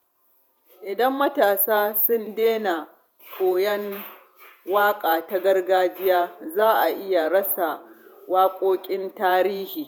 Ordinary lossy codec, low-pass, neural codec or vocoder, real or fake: none; none; vocoder, 48 kHz, 128 mel bands, Vocos; fake